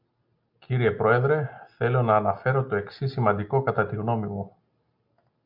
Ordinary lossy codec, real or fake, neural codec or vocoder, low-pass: MP3, 48 kbps; real; none; 5.4 kHz